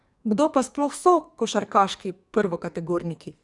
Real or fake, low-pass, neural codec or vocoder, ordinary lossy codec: fake; 10.8 kHz; codec, 44.1 kHz, 2.6 kbps, SNAC; Opus, 64 kbps